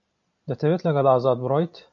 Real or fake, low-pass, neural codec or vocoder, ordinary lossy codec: real; 7.2 kHz; none; AAC, 64 kbps